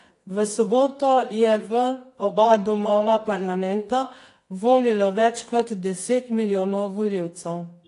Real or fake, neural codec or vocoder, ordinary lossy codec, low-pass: fake; codec, 24 kHz, 0.9 kbps, WavTokenizer, medium music audio release; AAC, 48 kbps; 10.8 kHz